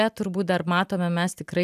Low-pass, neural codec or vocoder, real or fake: 14.4 kHz; none; real